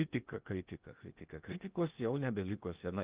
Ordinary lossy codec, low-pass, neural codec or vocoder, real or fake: Opus, 32 kbps; 3.6 kHz; codec, 16 kHz in and 24 kHz out, 0.8 kbps, FocalCodec, streaming, 65536 codes; fake